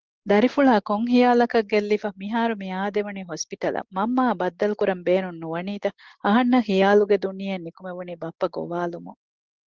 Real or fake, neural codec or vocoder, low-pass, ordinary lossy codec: real; none; 7.2 kHz; Opus, 16 kbps